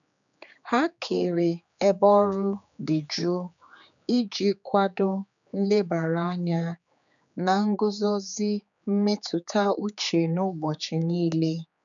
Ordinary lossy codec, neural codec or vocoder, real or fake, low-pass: none; codec, 16 kHz, 4 kbps, X-Codec, HuBERT features, trained on general audio; fake; 7.2 kHz